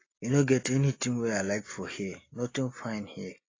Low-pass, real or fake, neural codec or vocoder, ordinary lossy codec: 7.2 kHz; real; none; AAC, 32 kbps